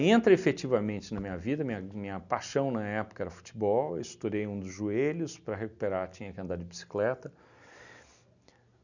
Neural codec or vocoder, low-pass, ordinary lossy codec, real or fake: none; 7.2 kHz; none; real